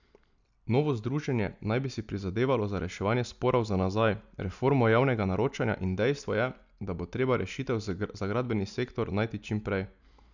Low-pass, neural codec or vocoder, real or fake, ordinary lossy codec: 7.2 kHz; none; real; none